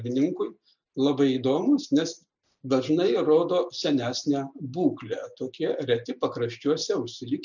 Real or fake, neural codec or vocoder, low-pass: real; none; 7.2 kHz